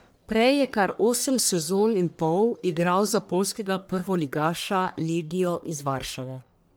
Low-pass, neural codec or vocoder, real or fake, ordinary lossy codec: none; codec, 44.1 kHz, 1.7 kbps, Pupu-Codec; fake; none